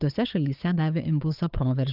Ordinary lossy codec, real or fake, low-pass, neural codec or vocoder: Opus, 24 kbps; fake; 5.4 kHz; codec, 16 kHz, 8 kbps, FunCodec, trained on Chinese and English, 25 frames a second